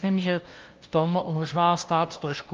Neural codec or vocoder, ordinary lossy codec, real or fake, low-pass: codec, 16 kHz, 0.5 kbps, FunCodec, trained on LibriTTS, 25 frames a second; Opus, 32 kbps; fake; 7.2 kHz